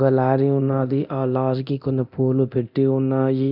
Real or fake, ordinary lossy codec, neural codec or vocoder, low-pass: fake; none; codec, 24 kHz, 0.9 kbps, DualCodec; 5.4 kHz